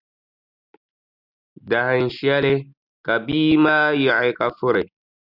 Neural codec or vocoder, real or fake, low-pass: none; real; 5.4 kHz